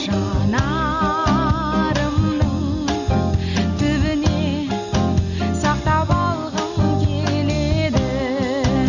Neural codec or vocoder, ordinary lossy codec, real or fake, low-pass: none; none; real; 7.2 kHz